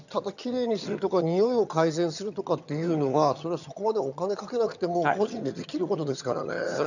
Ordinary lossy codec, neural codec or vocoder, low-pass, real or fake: none; vocoder, 22.05 kHz, 80 mel bands, HiFi-GAN; 7.2 kHz; fake